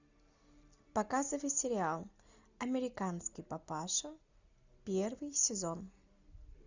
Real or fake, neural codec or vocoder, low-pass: real; none; 7.2 kHz